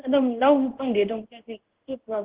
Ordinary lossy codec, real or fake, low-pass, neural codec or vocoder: Opus, 16 kbps; fake; 3.6 kHz; codec, 16 kHz in and 24 kHz out, 1 kbps, XY-Tokenizer